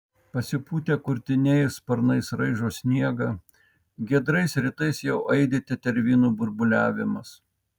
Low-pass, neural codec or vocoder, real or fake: 19.8 kHz; none; real